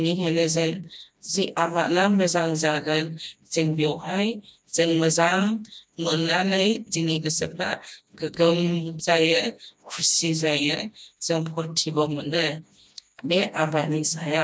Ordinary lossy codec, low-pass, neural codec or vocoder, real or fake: none; none; codec, 16 kHz, 1 kbps, FreqCodec, smaller model; fake